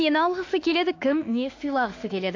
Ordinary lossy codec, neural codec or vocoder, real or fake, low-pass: AAC, 48 kbps; autoencoder, 48 kHz, 32 numbers a frame, DAC-VAE, trained on Japanese speech; fake; 7.2 kHz